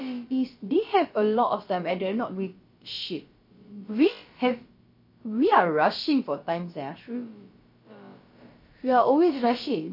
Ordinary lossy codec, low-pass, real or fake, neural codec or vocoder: MP3, 32 kbps; 5.4 kHz; fake; codec, 16 kHz, about 1 kbps, DyCAST, with the encoder's durations